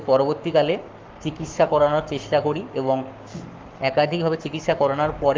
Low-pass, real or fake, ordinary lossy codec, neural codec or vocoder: 7.2 kHz; fake; Opus, 24 kbps; codec, 44.1 kHz, 7.8 kbps, Pupu-Codec